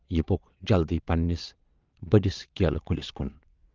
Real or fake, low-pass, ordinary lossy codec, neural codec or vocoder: fake; 7.2 kHz; Opus, 32 kbps; vocoder, 22.05 kHz, 80 mel bands, WaveNeXt